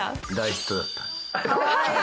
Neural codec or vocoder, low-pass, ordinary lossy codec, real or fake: none; none; none; real